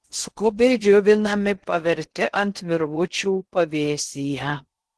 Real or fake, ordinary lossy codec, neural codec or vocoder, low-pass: fake; Opus, 16 kbps; codec, 16 kHz in and 24 kHz out, 0.6 kbps, FocalCodec, streaming, 2048 codes; 10.8 kHz